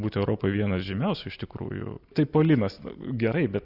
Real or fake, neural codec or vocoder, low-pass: real; none; 5.4 kHz